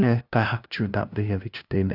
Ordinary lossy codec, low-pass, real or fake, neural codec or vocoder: Opus, 64 kbps; 5.4 kHz; fake; codec, 16 kHz, 0.5 kbps, FunCodec, trained on LibriTTS, 25 frames a second